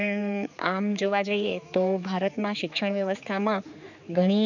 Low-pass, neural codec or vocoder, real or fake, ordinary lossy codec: 7.2 kHz; codec, 16 kHz, 4 kbps, X-Codec, HuBERT features, trained on general audio; fake; none